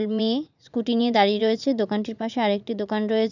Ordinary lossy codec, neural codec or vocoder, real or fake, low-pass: none; vocoder, 44.1 kHz, 80 mel bands, Vocos; fake; 7.2 kHz